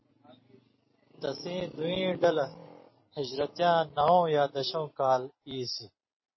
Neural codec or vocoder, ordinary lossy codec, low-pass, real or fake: vocoder, 44.1 kHz, 128 mel bands every 256 samples, BigVGAN v2; MP3, 24 kbps; 7.2 kHz; fake